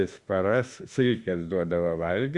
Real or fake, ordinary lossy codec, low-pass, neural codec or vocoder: fake; Opus, 64 kbps; 10.8 kHz; autoencoder, 48 kHz, 32 numbers a frame, DAC-VAE, trained on Japanese speech